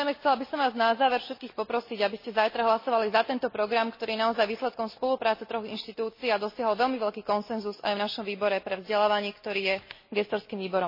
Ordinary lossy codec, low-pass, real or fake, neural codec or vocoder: MP3, 24 kbps; 5.4 kHz; real; none